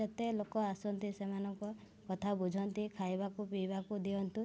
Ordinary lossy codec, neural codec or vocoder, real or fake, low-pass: none; none; real; none